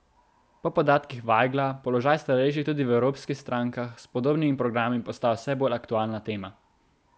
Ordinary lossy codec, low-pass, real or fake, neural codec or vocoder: none; none; real; none